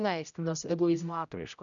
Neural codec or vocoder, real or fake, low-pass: codec, 16 kHz, 0.5 kbps, X-Codec, HuBERT features, trained on general audio; fake; 7.2 kHz